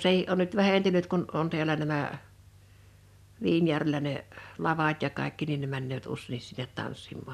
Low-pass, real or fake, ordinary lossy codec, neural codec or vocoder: 14.4 kHz; real; none; none